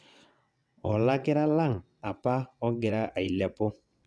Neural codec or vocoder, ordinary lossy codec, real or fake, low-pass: vocoder, 22.05 kHz, 80 mel bands, WaveNeXt; none; fake; none